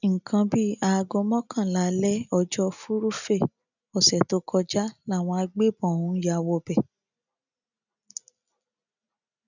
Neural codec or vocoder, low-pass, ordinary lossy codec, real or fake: none; 7.2 kHz; none; real